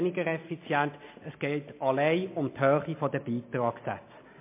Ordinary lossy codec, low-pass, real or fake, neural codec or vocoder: MP3, 24 kbps; 3.6 kHz; real; none